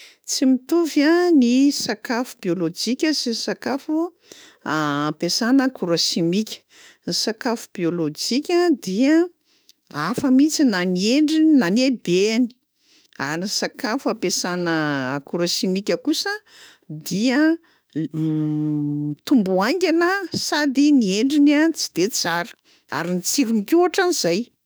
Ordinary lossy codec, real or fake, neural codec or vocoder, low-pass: none; fake; autoencoder, 48 kHz, 32 numbers a frame, DAC-VAE, trained on Japanese speech; none